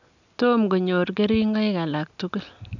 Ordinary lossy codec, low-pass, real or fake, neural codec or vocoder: none; 7.2 kHz; real; none